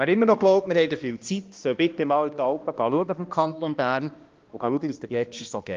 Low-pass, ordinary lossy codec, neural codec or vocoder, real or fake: 7.2 kHz; Opus, 32 kbps; codec, 16 kHz, 1 kbps, X-Codec, HuBERT features, trained on balanced general audio; fake